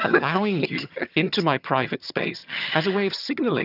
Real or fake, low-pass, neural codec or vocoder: fake; 5.4 kHz; vocoder, 22.05 kHz, 80 mel bands, HiFi-GAN